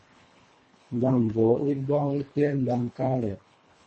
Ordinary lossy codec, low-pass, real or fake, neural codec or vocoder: MP3, 32 kbps; 10.8 kHz; fake; codec, 24 kHz, 1.5 kbps, HILCodec